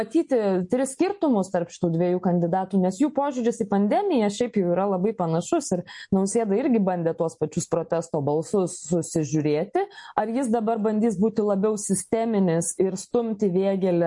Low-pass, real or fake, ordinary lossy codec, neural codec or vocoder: 10.8 kHz; real; MP3, 48 kbps; none